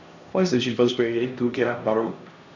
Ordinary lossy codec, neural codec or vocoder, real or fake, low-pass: none; codec, 16 kHz, 1 kbps, X-Codec, HuBERT features, trained on LibriSpeech; fake; 7.2 kHz